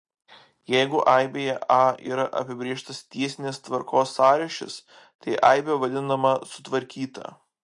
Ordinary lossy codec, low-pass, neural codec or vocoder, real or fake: MP3, 48 kbps; 10.8 kHz; none; real